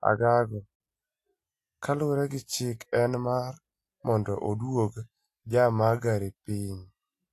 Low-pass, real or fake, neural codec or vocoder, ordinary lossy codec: 14.4 kHz; real; none; AAC, 64 kbps